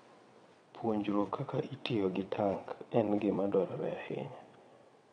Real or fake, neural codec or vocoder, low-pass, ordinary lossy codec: fake; vocoder, 22.05 kHz, 80 mel bands, WaveNeXt; 9.9 kHz; MP3, 64 kbps